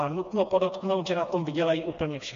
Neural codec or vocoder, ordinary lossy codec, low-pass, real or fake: codec, 16 kHz, 2 kbps, FreqCodec, smaller model; MP3, 48 kbps; 7.2 kHz; fake